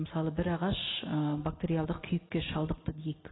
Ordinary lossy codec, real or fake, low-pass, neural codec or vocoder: AAC, 16 kbps; real; 7.2 kHz; none